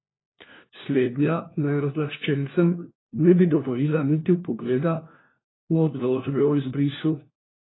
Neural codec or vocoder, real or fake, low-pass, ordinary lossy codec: codec, 16 kHz, 1 kbps, FunCodec, trained on LibriTTS, 50 frames a second; fake; 7.2 kHz; AAC, 16 kbps